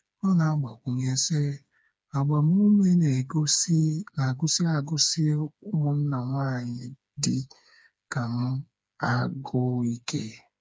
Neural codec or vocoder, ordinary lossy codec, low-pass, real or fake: codec, 16 kHz, 4 kbps, FreqCodec, smaller model; none; none; fake